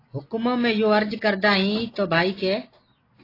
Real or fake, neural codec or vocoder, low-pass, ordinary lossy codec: real; none; 5.4 kHz; AAC, 24 kbps